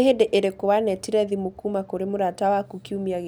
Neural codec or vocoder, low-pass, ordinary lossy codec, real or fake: none; none; none; real